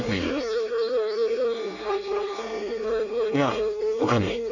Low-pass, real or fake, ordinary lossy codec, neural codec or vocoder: 7.2 kHz; fake; none; codec, 24 kHz, 1 kbps, SNAC